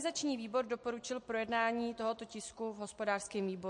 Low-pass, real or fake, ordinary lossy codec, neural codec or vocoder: 10.8 kHz; real; MP3, 48 kbps; none